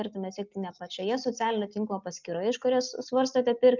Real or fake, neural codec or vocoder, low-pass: real; none; 7.2 kHz